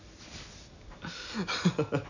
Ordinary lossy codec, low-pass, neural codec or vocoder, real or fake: none; 7.2 kHz; none; real